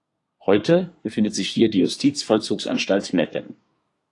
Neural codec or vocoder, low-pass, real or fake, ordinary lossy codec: codec, 24 kHz, 1 kbps, SNAC; 10.8 kHz; fake; AAC, 48 kbps